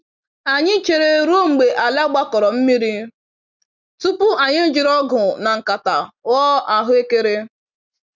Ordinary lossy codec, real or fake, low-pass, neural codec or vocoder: none; real; 7.2 kHz; none